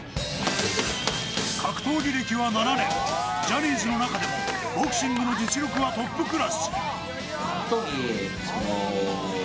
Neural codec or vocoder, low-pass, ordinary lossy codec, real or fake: none; none; none; real